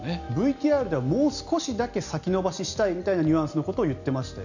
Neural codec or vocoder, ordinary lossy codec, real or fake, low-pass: none; none; real; 7.2 kHz